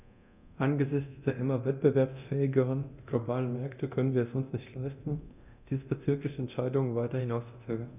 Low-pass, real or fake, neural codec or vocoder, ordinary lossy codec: 3.6 kHz; fake; codec, 24 kHz, 0.9 kbps, DualCodec; none